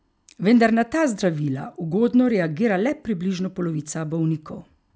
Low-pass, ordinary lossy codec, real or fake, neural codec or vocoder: none; none; real; none